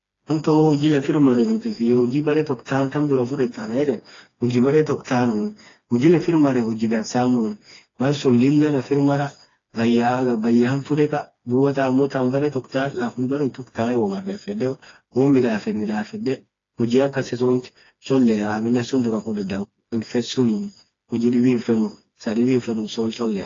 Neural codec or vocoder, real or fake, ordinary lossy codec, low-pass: codec, 16 kHz, 2 kbps, FreqCodec, smaller model; fake; AAC, 32 kbps; 7.2 kHz